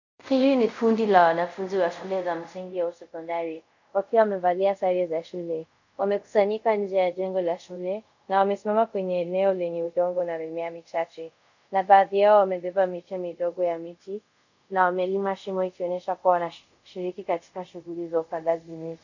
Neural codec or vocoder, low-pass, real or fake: codec, 24 kHz, 0.5 kbps, DualCodec; 7.2 kHz; fake